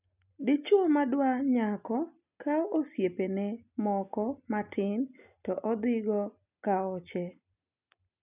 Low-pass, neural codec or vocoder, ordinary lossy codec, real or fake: 3.6 kHz; none; none; real